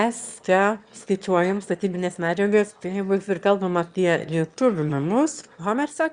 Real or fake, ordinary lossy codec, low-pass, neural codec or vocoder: fake; Opus, 64 kbps; 9.9 kHz; autoencoder, 22.05 kHz, a latent of 192 numbers a frame, VITS, trained on one speaker